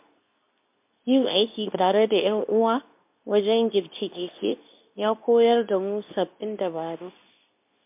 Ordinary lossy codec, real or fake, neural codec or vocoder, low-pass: MP3, 32 kbps; fake; codec, 24 kHz, 0.9 kbps, WavTokenizer, medium speech release version 2; 3.6 kHz